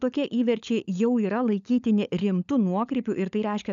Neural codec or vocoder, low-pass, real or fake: codec, 16 kHz, 16 kbps, FunCodec, trained on LibriTTS, 50 frames a second; 7.2 kHz; fake